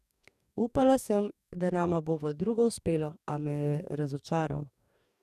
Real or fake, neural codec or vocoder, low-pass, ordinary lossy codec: fake; codec, 44.1 kHz, 2.6 kbps, DAC; 14.4 kHz; none